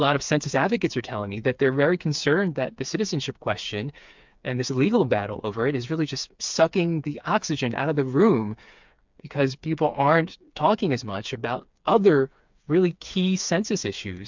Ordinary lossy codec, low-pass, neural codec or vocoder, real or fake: MP3, 64 kbps; 7.2 kHz; codec, 16 kHz, 4 kbps, FreqCodec, smaller model; fake